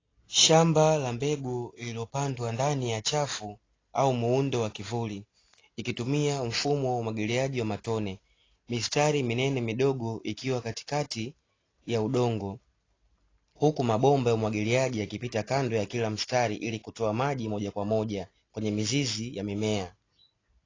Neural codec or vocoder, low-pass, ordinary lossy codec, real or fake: none; 7.2 kHz; AAC, 32 kbps; real